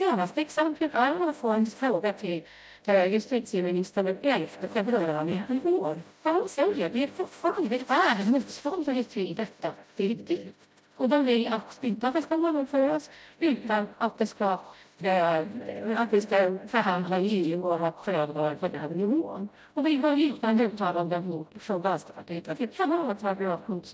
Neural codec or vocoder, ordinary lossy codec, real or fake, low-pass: codec, 16 kHz, 0.5 kbps, FreqCodec, smaller model; none; fake; none